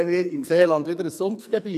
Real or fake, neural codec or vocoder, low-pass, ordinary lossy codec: fake; codec, 44.1 kHz, 2.6 kbps, SNAC; 14.4 kHz; none